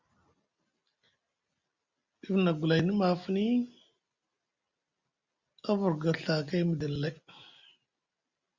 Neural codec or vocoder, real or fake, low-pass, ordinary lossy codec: none; real; 7.2 kHz; Opus, 64 kbps